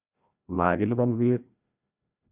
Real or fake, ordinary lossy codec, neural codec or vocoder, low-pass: fake; none; codec, 16 kHz, 1 kbps, FreqCodec, larger model; 3.6 kHz